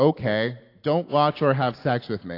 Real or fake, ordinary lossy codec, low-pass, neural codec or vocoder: real; AAC, 32 kbps; 5.4 kHz; none